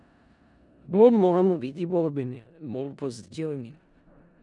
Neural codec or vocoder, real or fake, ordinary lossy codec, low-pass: codec, 16 kHz in and 24 kHz out, 0.4 kbps, LongCat-Audio-Codec, four codebook decoder; fake; none; 10.8 kHz